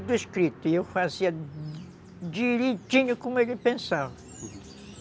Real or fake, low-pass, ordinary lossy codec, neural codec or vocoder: real; none; none; none